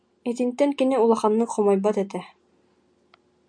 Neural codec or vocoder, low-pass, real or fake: none; 9.9 kHz; real